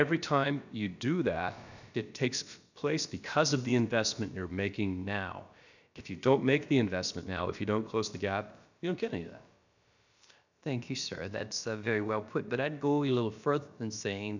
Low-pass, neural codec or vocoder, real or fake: 7.2 kHz; codec, 16 kHz, about 1 kbps, DyCAST, with the encoder's durations; fake